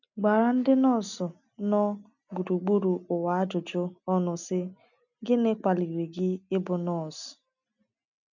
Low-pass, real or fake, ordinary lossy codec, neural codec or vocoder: 7.2 kHz; real; none; none